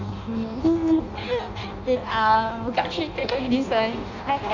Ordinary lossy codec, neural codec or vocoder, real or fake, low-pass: none; codec, 16 kHz in and 24 kHz out, 0.6 kbps, FireRedTTS-2 codec; fake; 7.2 kHz